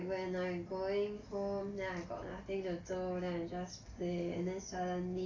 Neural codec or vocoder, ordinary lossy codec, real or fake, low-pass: none; AAC, 32 kbps; real; 7.2 kHz